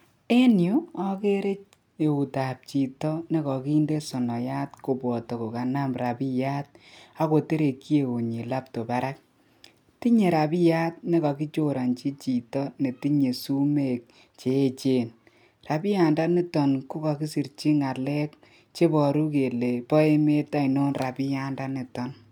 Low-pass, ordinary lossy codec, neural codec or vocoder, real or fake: 19.8 kHz; none; none; real